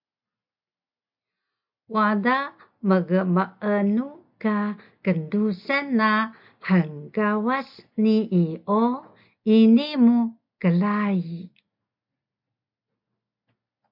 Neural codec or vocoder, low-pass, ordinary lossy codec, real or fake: none; 5.4 kHz; MP3, 48 kbps; real